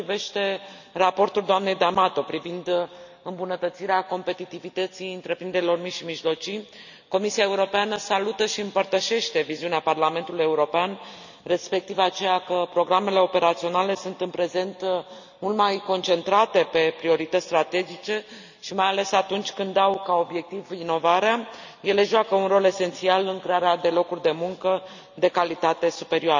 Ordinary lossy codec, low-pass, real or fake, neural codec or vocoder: none; 7.2 kHz; real; none